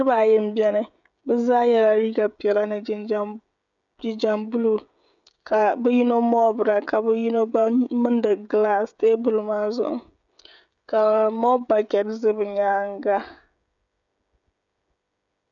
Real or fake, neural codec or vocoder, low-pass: fake; codec, 16 kHz, 16 kbps, FreqCodec, smaller model; 7.2 kHz